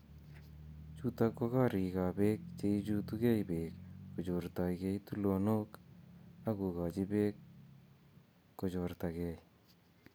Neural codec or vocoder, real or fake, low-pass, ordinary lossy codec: none; real; none; none